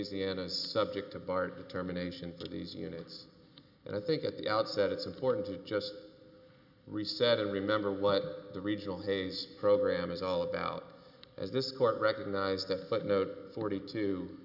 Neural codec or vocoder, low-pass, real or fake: none; 5.4 kHz; real